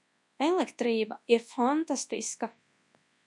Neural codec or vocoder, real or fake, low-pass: codec, 24 kHz, 0.9 kbps, WavTokenizer, large speech release; fake; 10.8 kHz